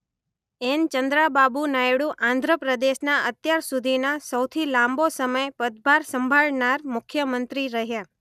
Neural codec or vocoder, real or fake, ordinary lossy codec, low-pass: none; real; none; 14.4 kHz